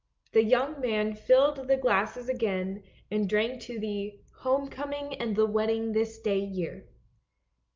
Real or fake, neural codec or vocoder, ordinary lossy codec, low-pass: real; none; Opus, 24 kbps; 7.2 kHz